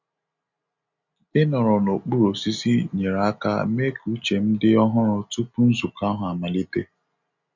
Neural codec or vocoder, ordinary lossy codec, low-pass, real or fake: none; none; 7.2 kHz; real